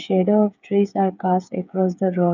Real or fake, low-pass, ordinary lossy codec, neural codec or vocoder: fake; 7.2 kHz; none; codec, 16 kHz, 8 kbps, FreqCodec, smaller model